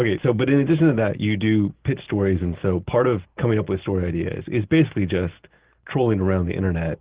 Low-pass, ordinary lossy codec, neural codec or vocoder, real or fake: 3.6 kHz; Opus, 16 kbps; none; real